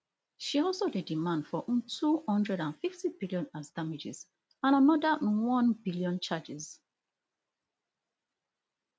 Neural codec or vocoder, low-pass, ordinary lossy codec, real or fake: none; none; none; real